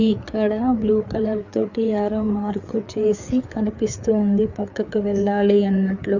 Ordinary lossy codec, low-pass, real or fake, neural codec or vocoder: none; 7.2 kHz; fake; codec, 16 kHz, 4 kbps, FreqCodec, larger model